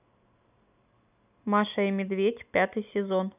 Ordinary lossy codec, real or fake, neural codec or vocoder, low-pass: none; real; none; 3.6 kHz